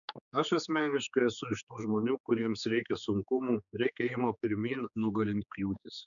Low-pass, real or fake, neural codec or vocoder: 7.2 kHz; fake; codec, 16 kHz, 4 kbps, X-Codec, HuBERT features, trained on general audio